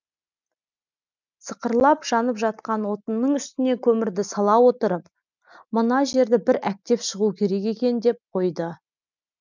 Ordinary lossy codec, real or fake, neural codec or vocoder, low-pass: none; real; none; 7.2 kHz